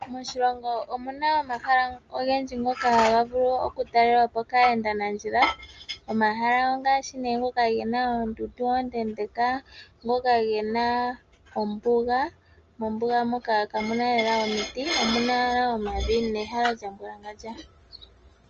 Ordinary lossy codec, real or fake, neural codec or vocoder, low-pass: Opus, 24 kbps; real; none; 7.2 kHz